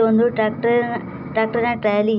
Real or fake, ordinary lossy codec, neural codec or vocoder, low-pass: real; none; none; 5.4 kHz